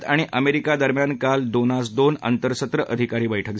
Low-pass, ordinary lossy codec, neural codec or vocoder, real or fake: none; none; none; real